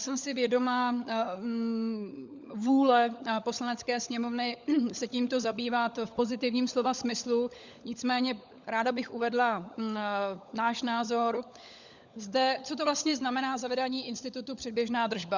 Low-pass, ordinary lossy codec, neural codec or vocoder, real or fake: 7.2 kHz; Opus, 64 kbps; codec, 16 kHz, 16 kbps, FunCodec, trained on LibriTTS, 50 frames a second; fake